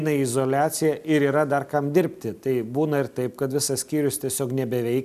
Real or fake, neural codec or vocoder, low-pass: real; none; 14.4 kHz